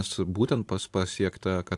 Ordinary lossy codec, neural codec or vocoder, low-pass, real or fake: AAC, 64 kbps; none; 10.8 kHz; real